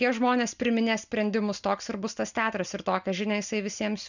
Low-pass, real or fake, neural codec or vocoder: 7.2 kHz; real; none